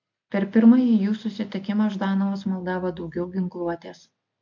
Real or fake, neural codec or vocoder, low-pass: real; none; 7.2 kHz